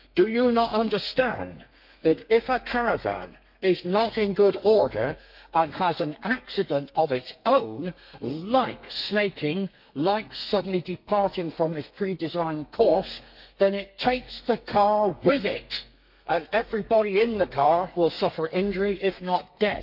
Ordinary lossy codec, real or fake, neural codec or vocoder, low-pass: MP3, 48 kbps; fake; codec, 32 kHz, 1.9 kbps, SNAC; 5.4 kHz